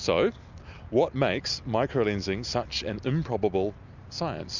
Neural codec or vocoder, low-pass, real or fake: none; 7.2 kHz; real